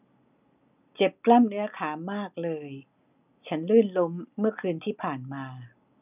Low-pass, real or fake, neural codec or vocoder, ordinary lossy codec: 3.6 kHz; real; none; none